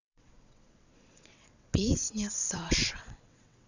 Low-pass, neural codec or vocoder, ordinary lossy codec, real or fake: 7.2 kHz; vocoder, 22.05 kHz, 80 mel bands, WaveNeXt; none; fake